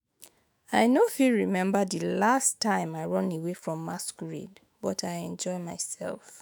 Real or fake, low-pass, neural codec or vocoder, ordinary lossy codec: fake; none; autoencoder, 48 kHz, 128 numbers a frame, DAC-VAE, trained on Japanese speech; none